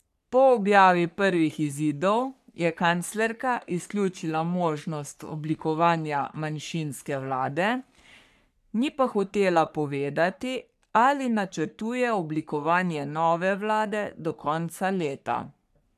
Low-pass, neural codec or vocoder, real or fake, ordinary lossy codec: 14.4 kHz; codec, 44.1 kHz, 3.4 kbps, Pupu-Codec; fake; none